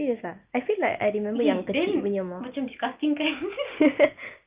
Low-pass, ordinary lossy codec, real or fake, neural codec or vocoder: 3.6 kHz; Opus, 24 kbps; real; none